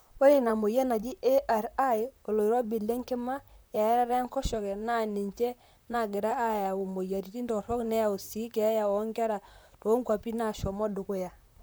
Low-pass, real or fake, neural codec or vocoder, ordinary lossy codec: none; fake; vocoder, 44.1 kHz, 128 mel bands, Pupu-Vocoder; none